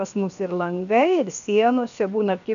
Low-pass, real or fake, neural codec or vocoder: 7.2 kHz; fake; codec, 16 kHz, 0.7 kbps, FocalCodec